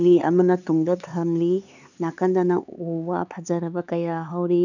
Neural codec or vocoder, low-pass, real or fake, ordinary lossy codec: codec, 16 kHz, 4 kbps, X-Codec, HuBERT features, trained on LibriSpeech; 7.2 kHz; fake; none